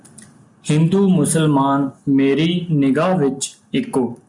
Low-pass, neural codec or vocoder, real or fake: 10.8 kHz; none; real